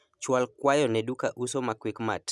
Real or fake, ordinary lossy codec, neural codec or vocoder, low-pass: real; none; none; none